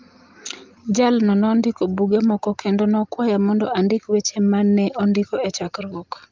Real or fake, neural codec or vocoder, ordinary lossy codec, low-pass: real; none; Opus, 32 kbps; 7.2 kHz